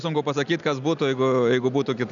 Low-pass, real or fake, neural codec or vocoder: 7.2 kHz; real; none